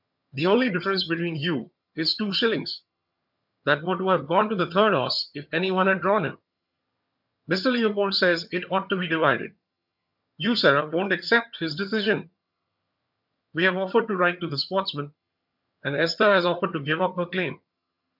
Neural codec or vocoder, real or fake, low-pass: vocoder, 22.05 kHz, 80 mel bands, HiFi-GAN; fake; 5.4 kHz